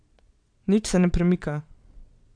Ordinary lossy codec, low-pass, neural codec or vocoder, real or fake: none; 9.9 kHz; vocoder, 22.05 kHz, 80 mel bands, Vocos; fake